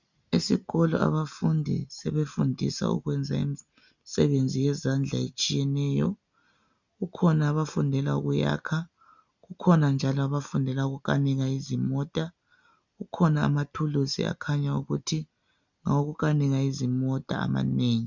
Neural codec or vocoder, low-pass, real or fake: none; 7.2 kHz; real